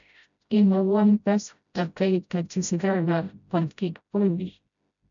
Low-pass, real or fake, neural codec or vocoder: 7.2 kHz; fake; codec, 16 kHz, 0.5 kbps, FreqCodec, smaller model